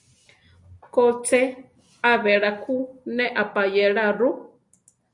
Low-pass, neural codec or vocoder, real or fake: 10.8 kHz; none; real